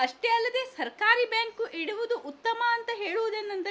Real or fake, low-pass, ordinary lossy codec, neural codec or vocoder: real; none; none; none